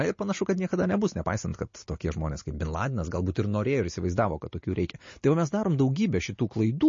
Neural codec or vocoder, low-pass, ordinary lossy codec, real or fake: none; 7.2 kHz; MP3, 32 kbps; real